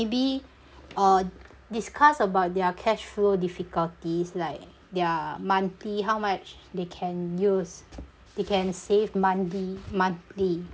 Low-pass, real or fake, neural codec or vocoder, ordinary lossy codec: none; real; none; none